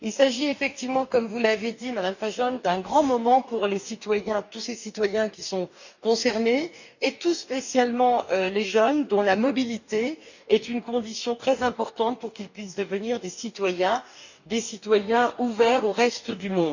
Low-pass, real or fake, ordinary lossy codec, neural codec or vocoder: 7.2 kHz; fake; none; codec, 44.1 kHz, 2.6 kbps, DAC